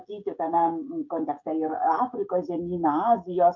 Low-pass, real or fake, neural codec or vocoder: 7.2 kHz; fake; codec, 16 kHz, 16 kbps, FreqCodec, smaller model